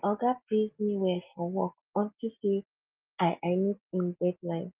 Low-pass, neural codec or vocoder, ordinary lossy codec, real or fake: 3.6 kHz; none; Opus, 32 kbps; real